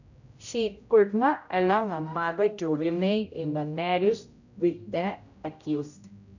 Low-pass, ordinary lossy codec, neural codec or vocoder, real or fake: 7.2 kHz; none; codec, 16 kHz, 0.5 kbps, X-Codec, HuBERT features, trained on general audio; fake